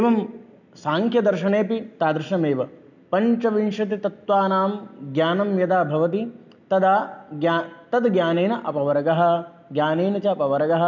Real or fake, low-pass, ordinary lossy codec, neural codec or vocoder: real; 7.2 kHz; none; none